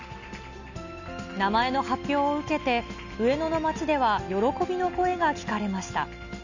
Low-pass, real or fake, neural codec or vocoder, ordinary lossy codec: 7.2 kHz; real; none; none